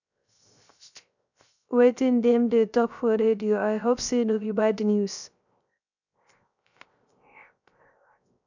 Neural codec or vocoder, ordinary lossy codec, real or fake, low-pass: codec, 16 kHz, 0.3 kbps, FocalCodec; none; fake; 7.2 kHz